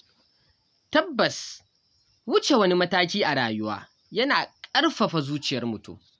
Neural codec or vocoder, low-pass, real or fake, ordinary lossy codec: none; none; real; none